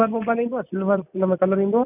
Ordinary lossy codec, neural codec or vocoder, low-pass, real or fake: none; none; 3.6 kHz; real